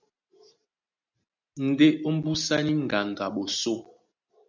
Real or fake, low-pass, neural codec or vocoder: real; 7.2 kHz; none